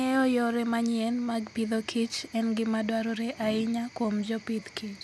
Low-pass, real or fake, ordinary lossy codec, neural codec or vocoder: none; real; none; none